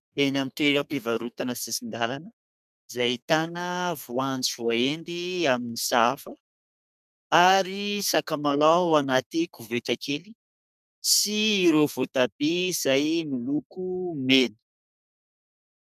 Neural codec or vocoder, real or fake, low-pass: codec, 32 kHz, 1.9 kbps, SNAC; fake; 14.4 kHz